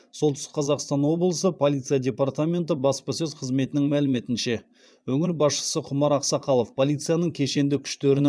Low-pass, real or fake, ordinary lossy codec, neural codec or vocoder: none; fake; none; vocoder, 22.05 kHz, 80 mel bands, Vocos